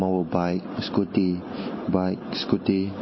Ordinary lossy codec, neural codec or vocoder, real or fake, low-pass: MP3, 24 kbps; none; real; 7.2 kHz